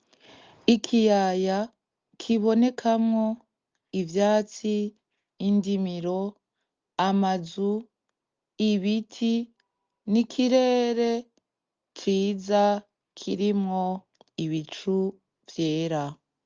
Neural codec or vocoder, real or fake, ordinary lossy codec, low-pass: none; real; Opus, 24 kbps; 7.2 kHz